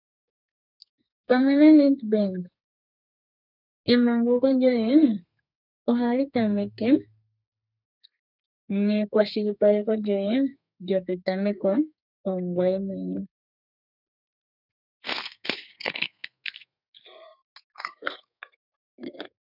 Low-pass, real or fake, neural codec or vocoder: 5.4 kHz; fake; codec, 32 kHz, 1.9 kbps, SNAC